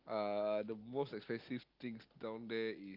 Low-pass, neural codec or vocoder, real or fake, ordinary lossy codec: 5.4 kHz; none; real; Opus, 24 kbps